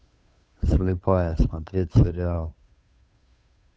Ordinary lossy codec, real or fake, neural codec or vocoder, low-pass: none; fake; codec, 16 kHz, 2 kbps, FunCodec, trained on Chinese and English, 25 frames a second; none